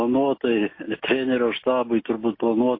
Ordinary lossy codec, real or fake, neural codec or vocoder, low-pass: MP3, 24 kbps; real; none; 5.4 kHz